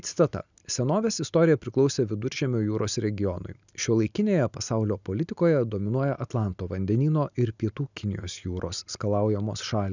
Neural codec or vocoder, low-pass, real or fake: none; 7.2 kHz; real